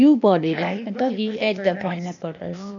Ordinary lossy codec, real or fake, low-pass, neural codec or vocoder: none; fake; 7.2 kHz; codec, 16 kHz, 0.8 kbps, ZipCodec